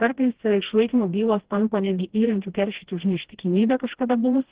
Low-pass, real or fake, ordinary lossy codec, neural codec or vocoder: 3.6 kHz; fake; Opus, 16 kbps; codec, 16 kHz, 1 kbps, FreqCodec, smaller model